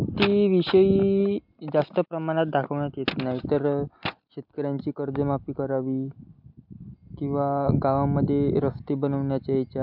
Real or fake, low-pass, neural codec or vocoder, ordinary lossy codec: real; 5.4 kHz; none; MP3, 48 kbps